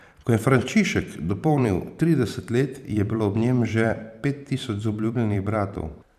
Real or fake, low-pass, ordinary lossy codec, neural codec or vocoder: fake; 14.4 kHz; none; vocoder, 44.1 kHz, 128 mel bands every 512 samples, BigVGAN v2